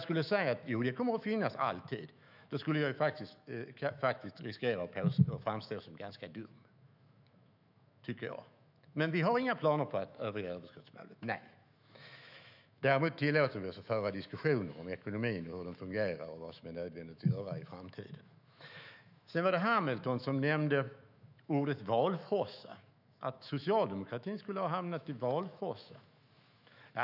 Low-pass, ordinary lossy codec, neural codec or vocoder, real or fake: 5.4 kHz; none; autoencoder, 48 kHz, 128 numbers a frame, DAC-VAE, trained on Japanese speech; fake